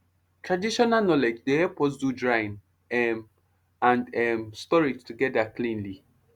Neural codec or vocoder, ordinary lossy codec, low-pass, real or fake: none; Opus, 64 kbps; 19.8 kHz; real